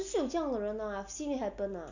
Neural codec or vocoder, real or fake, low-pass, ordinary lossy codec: none; real; 7.2 kHz; none